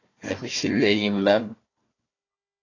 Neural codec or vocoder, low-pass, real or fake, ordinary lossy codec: codec, 16 kHz, 1 kbps, FunCodec, trained on Chinese and English, 50 frames a second; 7.2 kHz; fake; AAC, 32 kbps